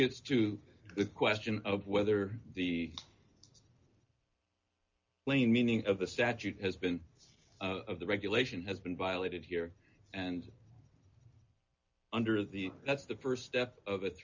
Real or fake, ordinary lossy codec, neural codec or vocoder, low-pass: real; MP3, 64 kbps; none; 7.2 kHz